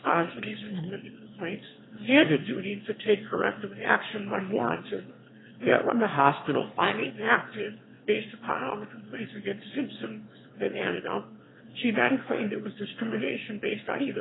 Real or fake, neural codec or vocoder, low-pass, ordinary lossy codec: fake; autoencoder, 22.05 kHz, a latent of 192 numbers a frame, VITS, trained on one speaker; 7.2 kHz; AAC, 16 kbps